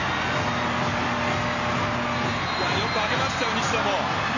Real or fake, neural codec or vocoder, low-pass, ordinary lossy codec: real; none; 7.2 kHz; AAC, 48 kbps